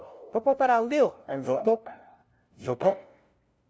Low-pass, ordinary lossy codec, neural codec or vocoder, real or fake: none; none; codec, 16 kHz, 0.5 kbps, FunCodec, trained on LibriTTS, 25 frames a second; fake